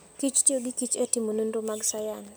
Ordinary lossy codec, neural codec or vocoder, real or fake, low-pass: none; none; real; none